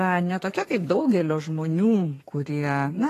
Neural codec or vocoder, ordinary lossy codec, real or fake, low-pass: codec, 32 kHz, 1.9 kbps, SNAC; AAC, 48 kbps; fake; 14.4 kHz